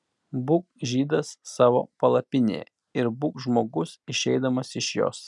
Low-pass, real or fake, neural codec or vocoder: 10.8 kHz; real; none